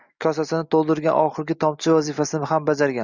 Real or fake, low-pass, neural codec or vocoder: real; 7.2 kHz; none